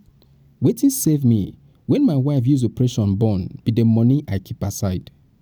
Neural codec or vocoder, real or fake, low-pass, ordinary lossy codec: none; real; none; none